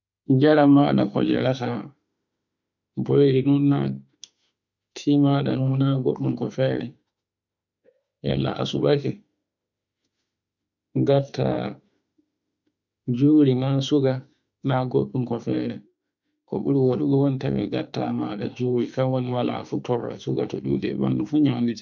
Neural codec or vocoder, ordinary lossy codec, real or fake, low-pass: autoencoder, 48 kHz, 32 numbers a frame, DAC-VAE, trained on Japanese speech; none; fake; 7.2 kHz